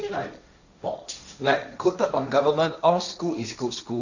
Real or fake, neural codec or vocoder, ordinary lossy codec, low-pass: fake; codec, 16 kHz, 1.1 kbps, Voila-Tokenizer; none; 7.2 kHz